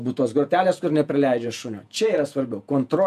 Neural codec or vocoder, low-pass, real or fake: vocoder, 48 kHz, 128 mel bands, Vocos; 14.4 kHz; fake